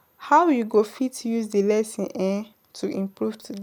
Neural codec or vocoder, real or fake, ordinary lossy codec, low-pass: none; real; none; none